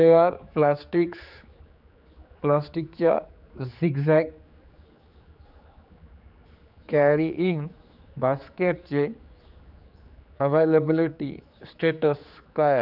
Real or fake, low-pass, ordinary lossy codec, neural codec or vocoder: fake; 5.4 kHz; none; codec, 16 kHz, 4 kbps, X-Codec, HuBERT features, trained on general audio